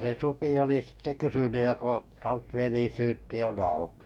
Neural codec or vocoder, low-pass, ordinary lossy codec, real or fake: codec, 44.1 kHz, 2.6 kbps, DAC; 19.8 kHz; none; fake